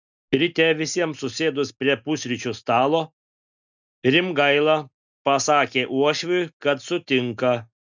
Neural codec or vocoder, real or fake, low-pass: none; real; 7.2 kHz